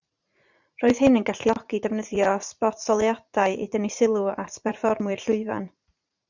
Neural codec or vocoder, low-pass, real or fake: vocoder, 44.1 kHz, 80 mel bands, Vocos; 7.2 kHz; fake